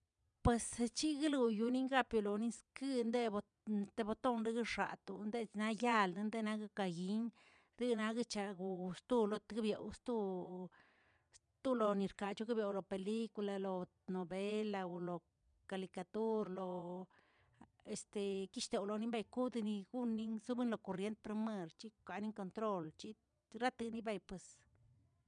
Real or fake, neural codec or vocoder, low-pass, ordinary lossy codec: fake; vocoder, 22.05 kHz, 80 mel bands, Vocos; 9.9 kHz; none